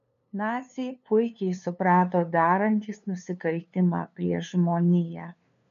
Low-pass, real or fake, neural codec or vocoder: 7.2 kHz; fake; codec, 16 kHz, 2 kbps, FunCodec, trained on LibriTTS, 25 frames a second